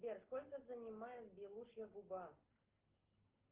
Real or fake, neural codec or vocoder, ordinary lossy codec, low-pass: real; none; Opus, 16 kbps; 3.6 kHz